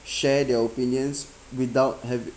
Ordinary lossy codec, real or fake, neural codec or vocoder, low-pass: none; real; none; none